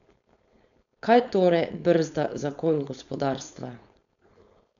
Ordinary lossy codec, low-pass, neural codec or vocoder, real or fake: none; 7.2 kHz; codec, 16 kHz, 4.8 kbps, FACodec; fake